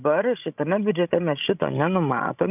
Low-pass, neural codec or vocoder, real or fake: 3.6 kHz; codec, 16 kHz, 16 kbps, FreqCodec, larger model; fake